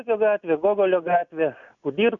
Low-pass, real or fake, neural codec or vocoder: 7.2 kHz; real; none